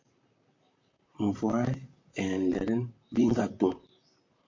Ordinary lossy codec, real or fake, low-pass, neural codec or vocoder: MP3, 48 kbps; fake; 7.2 kHz; vocoder, 44.1 kHz, 128 mel bands, Pupu-Vocoder